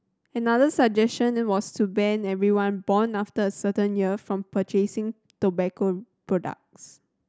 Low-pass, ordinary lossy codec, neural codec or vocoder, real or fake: none; none; none; real